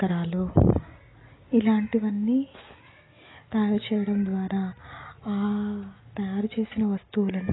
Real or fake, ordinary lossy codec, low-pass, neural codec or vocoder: real; AAC, 16 kbps; 7.2 kHz; none